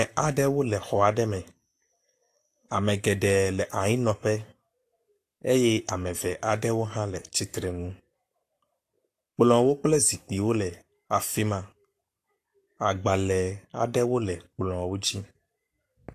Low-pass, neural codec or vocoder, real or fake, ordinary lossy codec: 14.4 kHz; codec, 44.1 kHz, 7.8 kbps, Pupu-Codec; fake; AAC, 64 kbps